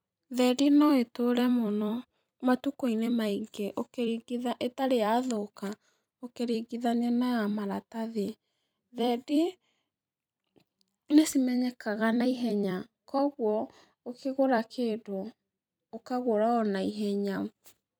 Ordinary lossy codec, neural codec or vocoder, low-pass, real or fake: none; vocoder, 44.1 kHz, 128 mel bands every 256 samples, BigVGAN v2; none; fake